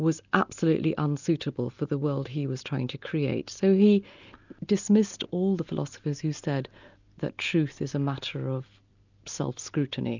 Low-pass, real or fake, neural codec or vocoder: 7.2 kHz; real; none